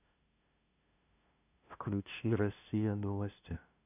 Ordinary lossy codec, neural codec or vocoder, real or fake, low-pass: none; codec, 16 kHz, 0.5 kbps, FunCodec, trained on LibriTTS, 25 frames a second; fake; 3.6 kHz